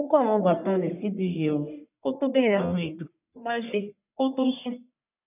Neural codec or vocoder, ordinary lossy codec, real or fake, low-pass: codec, 44.1 kHz, 1.7 kbps, Pupu-Codec; none; fake; 3.6 kHz